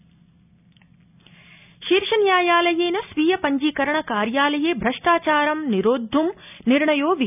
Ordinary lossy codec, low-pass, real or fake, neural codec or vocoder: none; 3.6 kHz; real; none